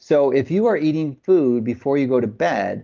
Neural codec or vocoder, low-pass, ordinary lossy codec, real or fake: none; 7.2 kHz; Opus, 32 kbps; real